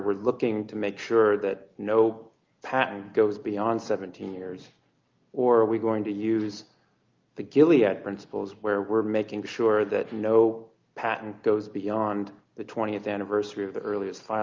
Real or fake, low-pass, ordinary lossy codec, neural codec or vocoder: real; 7.2 kHz; Opus, 24 kbps; none